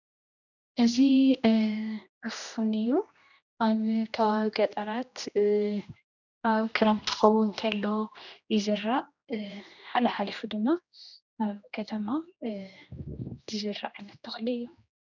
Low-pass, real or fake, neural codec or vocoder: 7.2 kHz; fake; codec, 16 kHz, 1 kbps, X-Codec, HuBERT features, trained on general audio